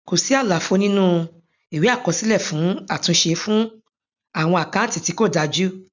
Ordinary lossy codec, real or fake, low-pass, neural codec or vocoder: none; real; 7.2 kHz; none